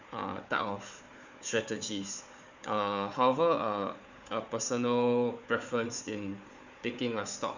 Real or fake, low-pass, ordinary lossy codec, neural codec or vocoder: fake; 7.2 kHz; none; codec, 16 kHz, 4 kbps, FunCodec, trained on Chinese and English, 50 frames a second